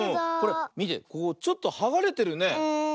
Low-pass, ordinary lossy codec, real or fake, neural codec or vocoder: none; none; real; none